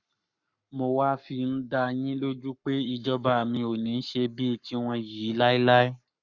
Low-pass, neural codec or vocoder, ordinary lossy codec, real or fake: 7.2 kHz; codec, 44.1 kHz, 7.8 kbps, Pupu-Codec; none; fake